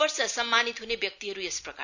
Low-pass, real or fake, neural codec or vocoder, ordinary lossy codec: 7.2 kHz; real; none; none